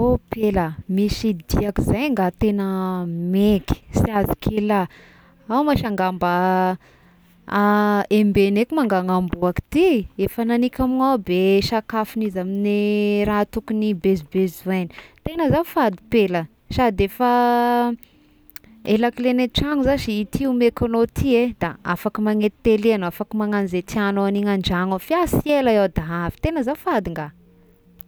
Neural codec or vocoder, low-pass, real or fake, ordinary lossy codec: none; none; real; none